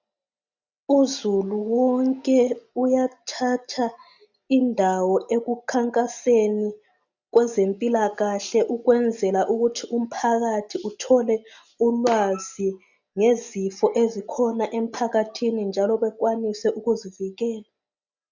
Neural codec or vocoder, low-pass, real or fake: none; 7.2 kHz; real